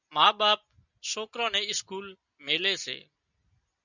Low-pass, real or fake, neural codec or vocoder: 7.2 kHz; real; none